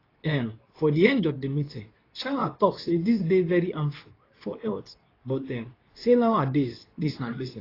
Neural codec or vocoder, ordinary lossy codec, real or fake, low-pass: codec, 24 kHz, 0.9 kbps, WavTokenizer, medium speech release version 2; AAC, 24 kbps; fake; 5.4 kHz